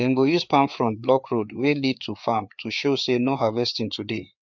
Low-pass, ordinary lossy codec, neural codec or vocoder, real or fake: 7.2 kHz; none; codec, 16 kHz, 4 kbps, FreqCodec, larger model; fake